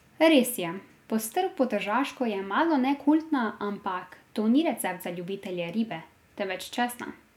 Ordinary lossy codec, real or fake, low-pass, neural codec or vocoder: none; real; 19.8 kHz; none